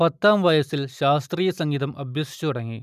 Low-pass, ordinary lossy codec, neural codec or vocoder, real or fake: 14.4 kHz; none; none; real